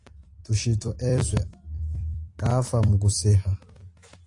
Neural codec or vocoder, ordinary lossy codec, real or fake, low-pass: vocoder, 44.1 kHz, 128 mel bands every 256 samples, BigVGAN v2; AAC, 48 kbps; fake; 10.8 kHz